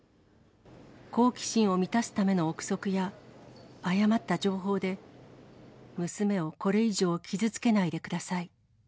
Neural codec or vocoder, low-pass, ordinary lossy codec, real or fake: none; none; none; real